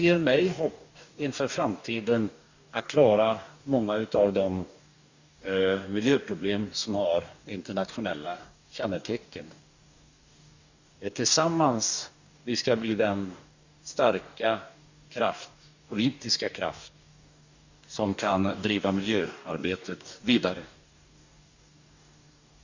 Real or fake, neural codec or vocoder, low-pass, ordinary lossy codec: fake; codec, 44.1 kHz, 2.6 kbps, DAC; 7.2 kHz; Opus, 64 kbps